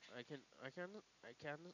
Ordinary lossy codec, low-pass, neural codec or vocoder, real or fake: none; 7.2 kHz; none; real